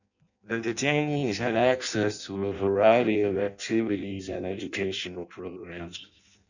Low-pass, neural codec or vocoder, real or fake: 7.2 kHz; codec, 16 kHz in and 24 kHz out, 0.6 kbps, FireRedTTS-2 codec; fake